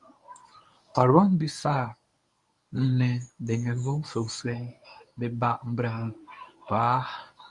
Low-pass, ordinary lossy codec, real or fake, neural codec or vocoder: 10.8 kHz; Opus, 64 kbps; fake; codec, 24 kHz, 0.9 kbps, WavTokenizer, medium speech release version 1